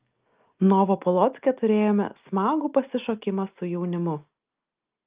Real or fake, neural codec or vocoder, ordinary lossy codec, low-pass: real; none; Opus, 24 kbps; 3.6 kHz